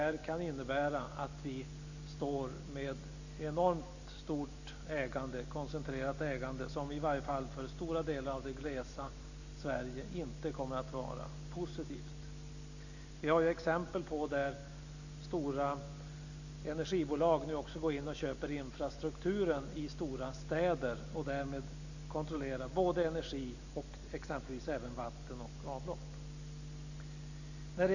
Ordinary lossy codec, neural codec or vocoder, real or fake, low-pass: none; none; real; 7.2 kHz